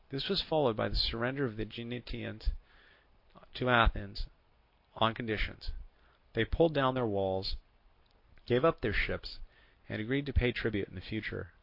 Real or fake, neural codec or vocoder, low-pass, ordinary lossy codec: real; none; 5.4 kHz; AAC, 48 kbps